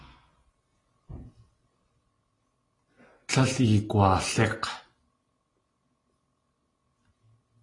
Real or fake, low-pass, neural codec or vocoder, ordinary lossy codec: real; 10.8 kHz; none; AAC, 32 kbps